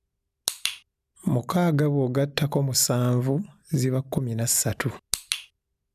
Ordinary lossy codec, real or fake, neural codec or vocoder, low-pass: none; real; none; 14.4 kHz